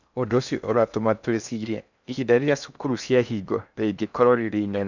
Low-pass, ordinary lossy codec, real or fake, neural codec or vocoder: 7.2 kHz; none; fake; codec, 16 kHz in and 24 kHz out, 0.6 kbps, FocalCodec, streaming, 2048 codes